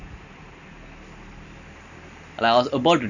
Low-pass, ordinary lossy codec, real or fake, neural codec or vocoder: 7.2 kHz; none; real; none